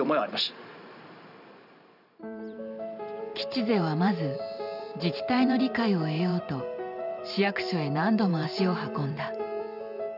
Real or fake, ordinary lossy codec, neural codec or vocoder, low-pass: fake; none; vocoder, 44.1 kHz, 128 mel bands every 512 samples, BigVGAN v2; 5.4 kHz